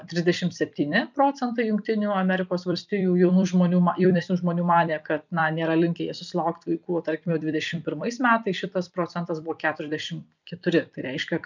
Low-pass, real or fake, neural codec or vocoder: 7.2 kHz; fake; vocoder, 44.1 kHz, 128 mel bands every 256 samples, BigVGAN v2